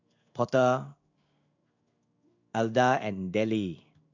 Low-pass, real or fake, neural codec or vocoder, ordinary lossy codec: 7.2 kHz; fake; codec, 16 kHz in and 24 kHz out, 1 kbps, XY-Tokenizer; none